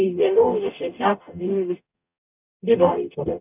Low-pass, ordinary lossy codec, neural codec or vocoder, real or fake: 3.6 kHz; none; codec, 44.1 kHz, 0.9 kbps, DAC; fake